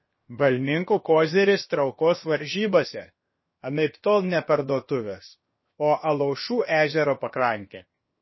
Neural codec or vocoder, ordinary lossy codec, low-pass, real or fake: codec, 16 kHz, 0.7 kbps, FocalCodec; MP3, 24 kbps; 7.2 kHz; fake